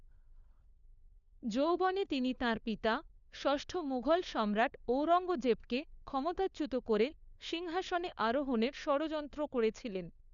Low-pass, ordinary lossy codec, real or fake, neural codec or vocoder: 7.2 kHz; none; fake; codec, 16 kHz, 4 kbps, FunCodec, trained on LibriTTS, 50 frames a second